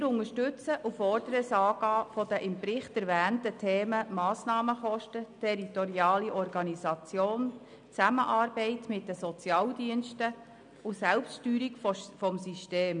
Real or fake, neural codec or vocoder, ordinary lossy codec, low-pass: real; none; none; 9.9 kHz